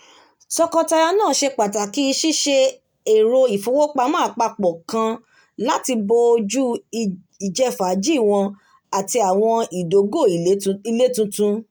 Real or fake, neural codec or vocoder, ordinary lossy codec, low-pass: real; none; none; none